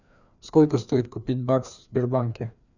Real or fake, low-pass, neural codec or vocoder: fake; 7.2 kHz; codec, 16 kHz, 2 kbps, FreqCodec, larger model